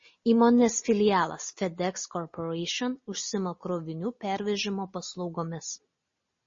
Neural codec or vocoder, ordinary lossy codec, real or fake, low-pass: none; MP3, 32 kbps; real; 7.2 kHz